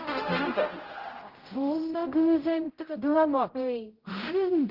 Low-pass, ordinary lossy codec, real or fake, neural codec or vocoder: 5.4 kHz; Opus, 16 kbps; fake; codec, 16 kHz, 0.5 kbps, X-Codec, HuBERT features, trained on general audio